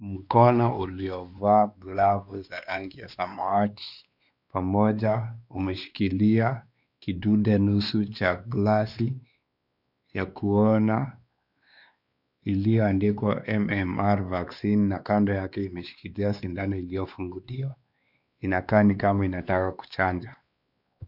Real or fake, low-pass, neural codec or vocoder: fake; 5.4 kHz; codec, 16 kHz, 2 kbps, X-Codec, WavLM features, trained on Multilingual LibriSpeech